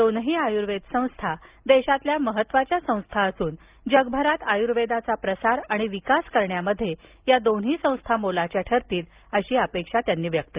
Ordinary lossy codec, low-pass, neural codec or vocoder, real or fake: Opus, 24 kbps; 3.6 kHz; none; real